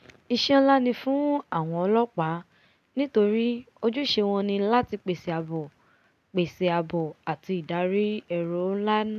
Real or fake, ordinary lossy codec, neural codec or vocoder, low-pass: real; none; none; 14.4 kHz